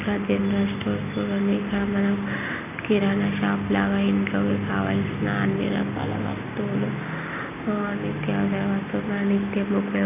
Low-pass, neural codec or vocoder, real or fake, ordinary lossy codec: 3.6 kHz; none; real; none